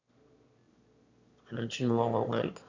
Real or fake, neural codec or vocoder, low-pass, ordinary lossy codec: fake; autoencoder, 22.05 kHz, a latent of 192 numbers a frame, VITS, trained on one speaker; 7.2 kHz; Opus, 64 kbps